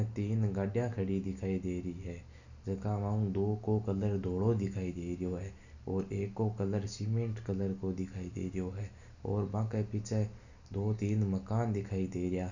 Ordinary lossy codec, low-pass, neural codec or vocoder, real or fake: none; 7.2 kHz; none; real